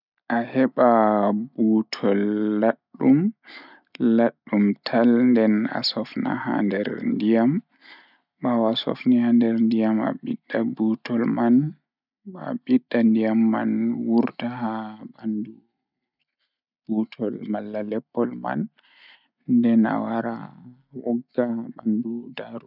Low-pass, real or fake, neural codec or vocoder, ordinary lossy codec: 5.4 kHz; real; none; none